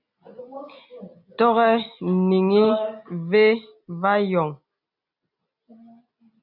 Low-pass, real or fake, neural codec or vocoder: 5.4 kHz; real; none